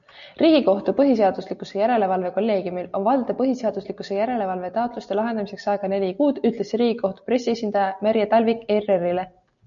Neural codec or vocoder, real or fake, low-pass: none; real; 7.2 kHz